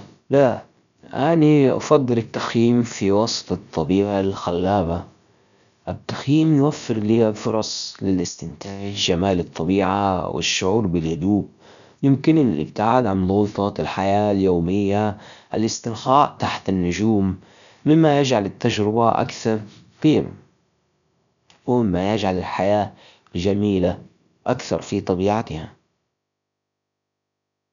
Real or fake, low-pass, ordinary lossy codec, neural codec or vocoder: fake; 7.2 kHz; none; codec, 16 kHz, about 1 kbps, DyCAST, with the encoder's durations